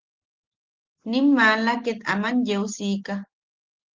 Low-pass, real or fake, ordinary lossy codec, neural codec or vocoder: 7.2 kHz; real; Opus, 16 kbps; none